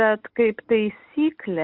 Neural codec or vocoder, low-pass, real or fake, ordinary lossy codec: none; 5.4 kHz; real; Opus, 64 kbps